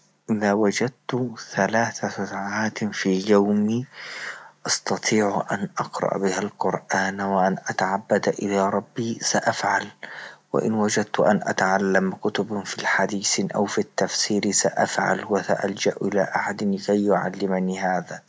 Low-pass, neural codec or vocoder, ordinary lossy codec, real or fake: none; none; none; real